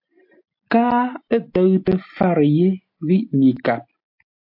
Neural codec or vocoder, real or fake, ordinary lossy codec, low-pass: none; real; AAC, 48 kbps; 5.4 kHz